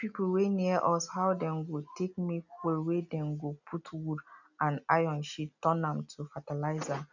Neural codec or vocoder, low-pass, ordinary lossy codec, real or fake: none; 7.2 kHz; none; real